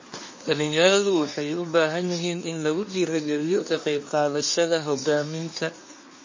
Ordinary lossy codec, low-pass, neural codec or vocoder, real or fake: MP3, 32 kbps; 7.2 kHz; codec, 24 kHz, 1 kbps, SNAC; fake